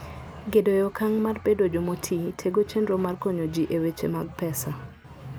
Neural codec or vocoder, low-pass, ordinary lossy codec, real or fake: none; none; none; real